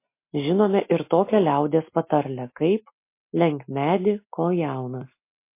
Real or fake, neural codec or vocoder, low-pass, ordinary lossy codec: real; none; 3.6 kHz; MP3, 24 kbps